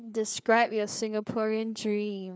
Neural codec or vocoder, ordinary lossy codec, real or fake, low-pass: codec, 16 kHz, 4 kbps, FreqCodec, larger model; none; fake; none